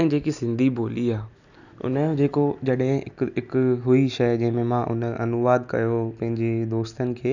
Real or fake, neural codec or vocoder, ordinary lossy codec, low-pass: real; none; none; 7.2 kHz